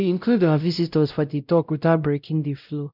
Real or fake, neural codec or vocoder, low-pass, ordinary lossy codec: fake; codec, 16 kHz, 0.5 kbps, X-Codec, WavLM features, trained on Multilingual LibriSpeech; 5.4 kHz; none